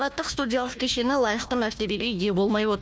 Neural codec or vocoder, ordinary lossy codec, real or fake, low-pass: codec, 16 kHz, 1 kbps, FunCodec, trained on Chinese and English, 50 frames a second; none; fake; none